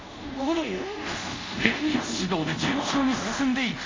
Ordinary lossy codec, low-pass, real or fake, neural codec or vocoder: MP3, 48 kbps; 7.2 kHz; fake; codec, 24 kHz, 0.5 kbps, DualCodec